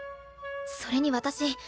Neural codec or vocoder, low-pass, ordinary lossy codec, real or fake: none; none; none; real